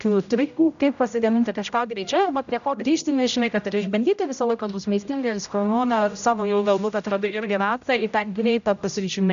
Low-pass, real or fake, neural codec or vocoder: 7.2 kHz; fake; codec, 16 kHz, 0.5 kbps, X-Codec, HuBERT features, trained on general audio